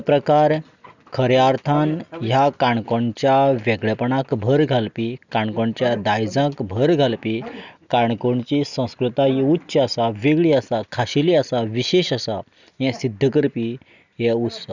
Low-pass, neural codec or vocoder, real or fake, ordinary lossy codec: 7.2 kHz; none; real; none